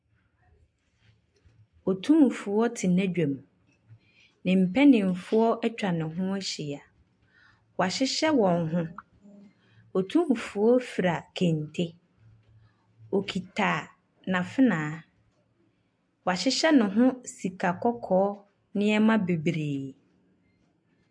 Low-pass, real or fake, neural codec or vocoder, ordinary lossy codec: 9.9 kHz; real; none; MP3, 64 kbps